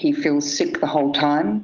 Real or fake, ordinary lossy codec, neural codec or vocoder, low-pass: real; Opus, 24 kbps; none; 7.2 kHz